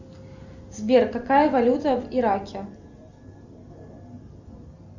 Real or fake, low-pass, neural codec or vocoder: real; 7.2 kHz; none